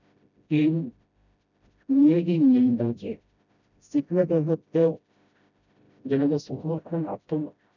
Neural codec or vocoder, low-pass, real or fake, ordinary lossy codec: codec, 16 kHz, 0.5 kbps, FreqCodec, smaller model; 7.2 kHz; fake; none